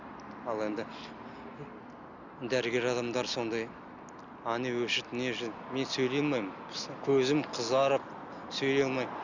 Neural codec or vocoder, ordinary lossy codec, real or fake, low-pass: none; none; real; 7.2 kHz